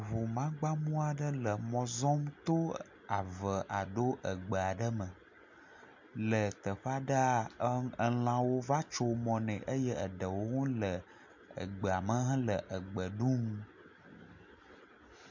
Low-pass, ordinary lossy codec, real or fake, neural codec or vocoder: 7.2 kHz; MP3, 64 kbps; real; none